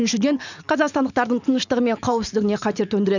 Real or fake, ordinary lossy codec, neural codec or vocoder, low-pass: fake; none; vocoder, 44.1 kHz, 128 mel bands every 512 samples, BigVGAN v2; 7.2 kHz